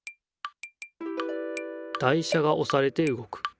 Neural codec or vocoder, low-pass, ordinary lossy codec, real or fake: none; none; none; real